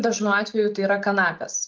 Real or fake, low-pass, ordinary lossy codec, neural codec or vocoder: real; 7.2 kHz; Opus, 32 kbps; none